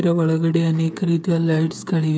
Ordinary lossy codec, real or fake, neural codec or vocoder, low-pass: none; fake; codec, 16 kHz, 8 kbps, FreqCodec, smaller model; none